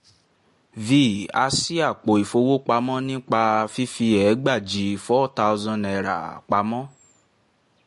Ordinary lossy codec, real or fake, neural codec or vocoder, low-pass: MP3, 48 kbps; real; none; 14.4 kHz